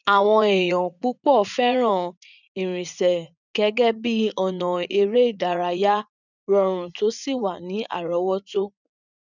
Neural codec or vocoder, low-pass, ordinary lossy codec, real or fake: vocoder, 44.1 kHz, 80 mel bands, Vocos; 7.2 kHz; none; fake